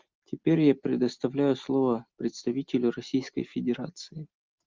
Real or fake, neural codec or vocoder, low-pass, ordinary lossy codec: real; none; 7.2 kHz; Opus, 24 kbps